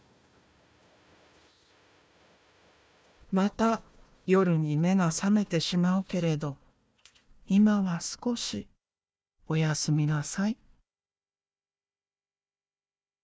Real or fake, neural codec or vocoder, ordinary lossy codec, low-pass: fake; codec, 16 kHz, 1 kbps, FunCodec, trained on Chinese and English, 50 frames a second; none; none